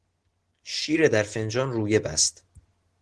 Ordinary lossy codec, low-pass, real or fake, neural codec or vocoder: Opus, 16 kbps; 10.8 kHz; real; none